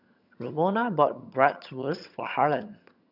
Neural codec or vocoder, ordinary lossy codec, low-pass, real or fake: vocoder, 22.05 kHz, 80 mel bands, HiFi-GAN; none; 5.4 kHz; fake